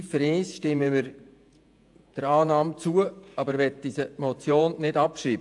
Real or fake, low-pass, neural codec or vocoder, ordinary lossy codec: fake; 10.8 kHz; vocoder, 48 kHz, 128 mel bands, Vocos; AAC, 64 kbps